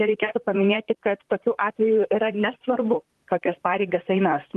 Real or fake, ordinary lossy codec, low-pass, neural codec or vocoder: fake; Opus, 24 kbps; 14.4 kHz; vocoder, 44.1 kHz, 128 mel bands, Pupu-Vocoder